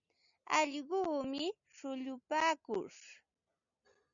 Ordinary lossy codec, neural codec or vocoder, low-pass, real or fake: MP3, 96 kbps; none; 7.2 kHz; real